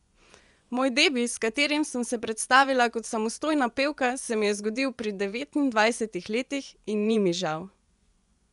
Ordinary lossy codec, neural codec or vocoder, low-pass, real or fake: none; vocoder, 24 kHz, 100 mel bands, Vocos; 10.8 kHz; fake